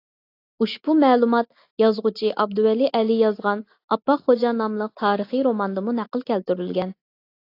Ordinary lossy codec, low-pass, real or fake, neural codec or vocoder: AAC, 32 kbps; 5.4 kHz; real; none